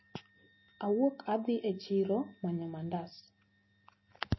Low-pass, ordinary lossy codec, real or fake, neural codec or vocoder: 7.2 kHz; MP3, 24 kbps; real; none